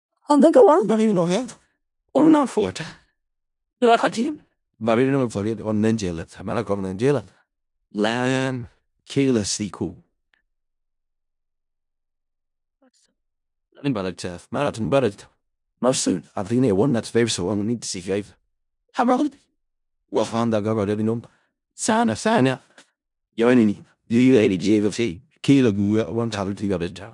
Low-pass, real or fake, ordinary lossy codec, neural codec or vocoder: 10.8 kHz; fake; none; codec, 16 kHz in and 24 kHz out, 0.4 kbps, LongCat-Audio-Codec, four codebook decoder